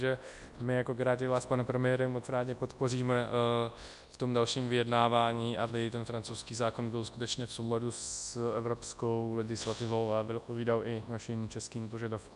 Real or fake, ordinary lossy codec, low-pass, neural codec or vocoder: fake; AAC, 96 kbps; 10.8 kHz; codec, 24 kHz, 0.9 kbps, WavTokenizer, large speech release